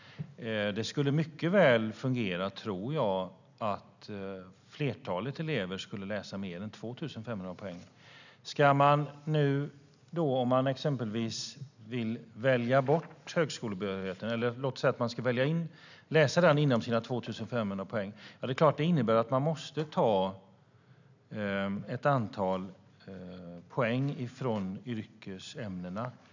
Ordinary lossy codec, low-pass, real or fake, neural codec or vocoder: none; 7.2 kHz; real; none